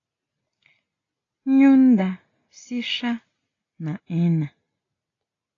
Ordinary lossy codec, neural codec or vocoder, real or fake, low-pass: AAC, 32 kbps; none; real; 7.2 kHz